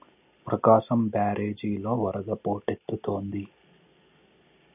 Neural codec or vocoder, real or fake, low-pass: none; real; 3.6 kHz